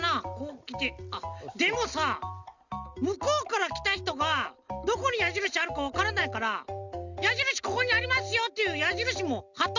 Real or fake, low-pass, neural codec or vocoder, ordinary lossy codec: real; 7.2 kHz; none; Opus, 64 kbps